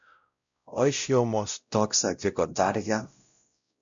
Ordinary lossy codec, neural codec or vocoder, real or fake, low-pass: MP3, 64 kbps; codec, 16 kHz, 0.5 kbps, X-Codec, WavLM features, trained on Multilingual LibriSpeech; fake; 7.2 kHz